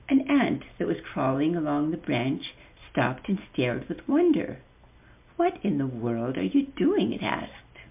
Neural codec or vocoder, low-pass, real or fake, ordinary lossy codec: none; 3.6 kHz; real; MP3, 32 kbps